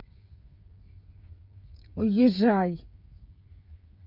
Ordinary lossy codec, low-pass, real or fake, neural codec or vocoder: none; 5.4 kHz; fake; codec, 16 kHz, 8 kbps, FreqCodec, smaller model